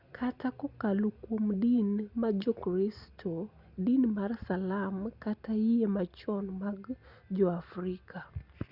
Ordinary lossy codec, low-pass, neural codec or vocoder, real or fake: Opus, 64 kbps; 5.4 kHz; none; real